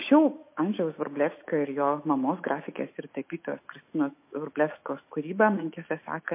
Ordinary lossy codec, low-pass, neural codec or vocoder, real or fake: MP3, 24 kbps; 3.6 kHz; autoencoder, 48 kHz, 128 numbers a frame, DAC-VAE, trained on Japanese speech; fake